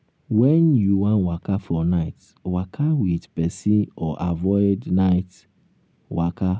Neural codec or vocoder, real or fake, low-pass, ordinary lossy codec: none; real; none; none